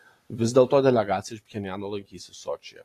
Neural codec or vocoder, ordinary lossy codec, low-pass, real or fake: none; AAC, 48 kbps; 14.4 kHz; real